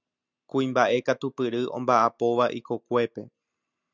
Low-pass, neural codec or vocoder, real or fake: 7.2 kHz; none; real